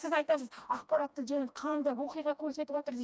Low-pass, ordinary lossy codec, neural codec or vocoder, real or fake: none; none; codec, 16 kHz, 1 kbps, FreqCodec, smaller model; fake